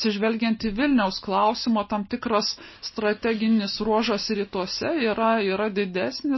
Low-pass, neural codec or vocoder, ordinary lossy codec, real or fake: 7.2 kHz; none; MP3, 24 kbps; real